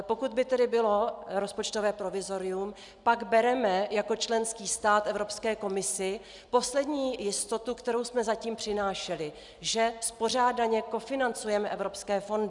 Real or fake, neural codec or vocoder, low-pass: real; none; 10.8 kHz